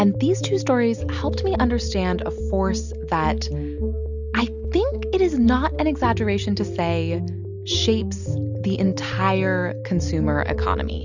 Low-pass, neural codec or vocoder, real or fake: 7.2 kHz; none; real